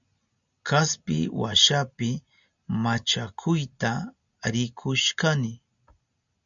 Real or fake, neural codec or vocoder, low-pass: real; none; 7.2 kHz